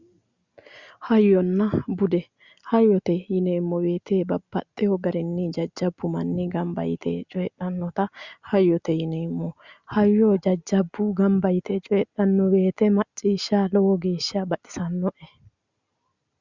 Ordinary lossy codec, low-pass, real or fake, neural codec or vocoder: Opus, 64 kbps; 7.2 kHz; real; none